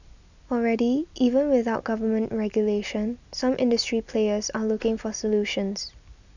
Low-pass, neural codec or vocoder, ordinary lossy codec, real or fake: 7.2 kHz; none; none; real